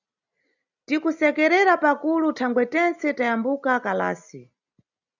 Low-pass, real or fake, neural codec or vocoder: 7.2 kHz; real; none